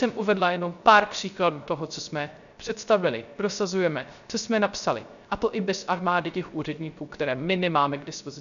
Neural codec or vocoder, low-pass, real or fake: codec, 16 kHz, 0.3 kbps, FocalCodec; 7.2 kHz; fake